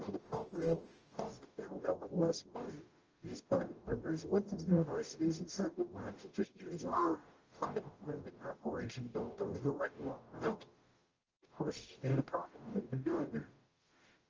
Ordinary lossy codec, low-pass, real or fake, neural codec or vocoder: Opus, 24 kbps; 7.2 kHz; fake; codec, 44.1 kHz, 0.9 kbps, DAC